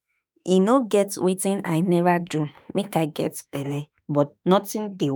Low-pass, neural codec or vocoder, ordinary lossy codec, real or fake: none; autoencoder, 48 kHz, 32 numbers a frame, DAC-VAE, trained on Japanese speech; none; fake